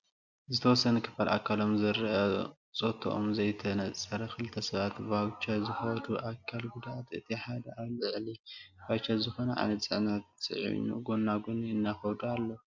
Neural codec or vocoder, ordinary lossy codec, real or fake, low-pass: none; MP3, 64 kbps; real; 7.2 kHz